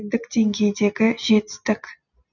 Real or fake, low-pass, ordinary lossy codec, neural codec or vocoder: real; 7.2 kHz; none; none